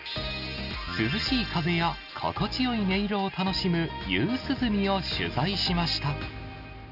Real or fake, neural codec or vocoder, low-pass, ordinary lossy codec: real; none; 5.4 kHz; none